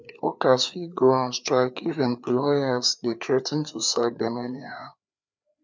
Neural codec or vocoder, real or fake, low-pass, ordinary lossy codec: codec, 16 kHz, 4 kbps, FreqCodec, larger model; fake; none; none